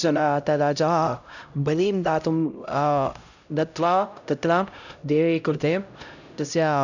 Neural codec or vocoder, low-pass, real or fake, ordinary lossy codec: codec, 16 kHz, 0.5 kbps, X-Codec, HuBERT features, trained on LibriSpeech; 7.2 kHz; fake; none